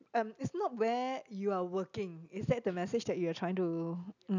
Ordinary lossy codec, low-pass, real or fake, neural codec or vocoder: none; 7.2 kHz; real; none